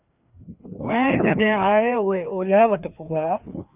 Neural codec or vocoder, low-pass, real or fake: codec, 16 kHz, 2 kbps, FreqCodec, larger model; 3.6 kHz; fake